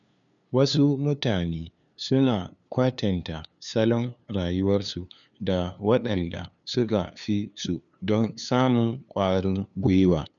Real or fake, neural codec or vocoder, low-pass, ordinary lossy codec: fake; codec, 16 kHz, 2 kbps, FunCodec, trained on LibriTTS, 25 frames a second; 7.2 kHz; none